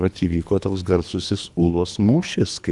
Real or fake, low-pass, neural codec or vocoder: fake; 10.8 kHz; codec, 24 kHz, 3 kbps, HILCodec